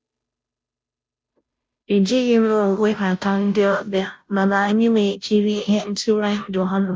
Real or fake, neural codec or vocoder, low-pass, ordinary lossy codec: fake; codec, 16 kHz, 0.5 kbps, FunCodec, trained on Chinese and English, 25 frames a second; none; none